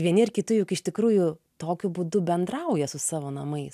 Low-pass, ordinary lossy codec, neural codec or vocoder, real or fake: 14.4 kHz; AAC, 96 kbps; none; real